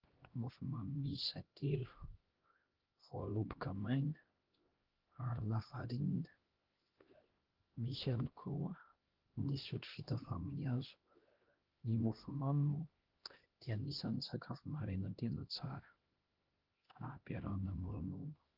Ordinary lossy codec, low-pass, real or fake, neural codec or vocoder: Opus, 16 kbps; 5.4 kHz; fake; codec, 16 kHz, 1 kbps, X-Codec, HuBERT features, trained on LibriSpeech